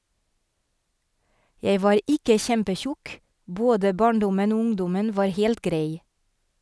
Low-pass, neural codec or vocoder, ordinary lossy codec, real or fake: none; none; none; real